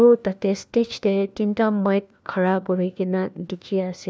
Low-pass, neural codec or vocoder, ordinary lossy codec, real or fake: none; codec, 16 kHz, 1 kbps, FunCodec, trained on LibriTTS, 50 frames a second; none; fake